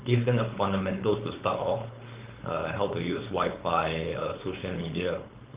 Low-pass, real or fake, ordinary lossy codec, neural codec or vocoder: 3.6 kHz; fake; Opus, 32 kbps; codec, 16 kHz, 4.8 kbps, FACodec